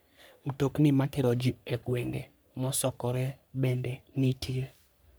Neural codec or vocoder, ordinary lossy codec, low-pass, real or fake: codec, 44.1 kHz, 3.4 kbps, Pupu-Codec; none; none; fake